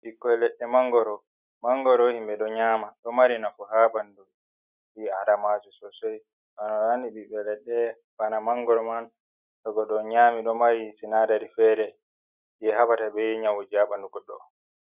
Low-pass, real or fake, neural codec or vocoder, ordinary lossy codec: 3.6 kHz; real; none; Opus, 64 kbps